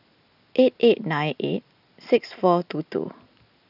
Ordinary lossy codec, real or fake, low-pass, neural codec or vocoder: none; real; 5.4 kHz; none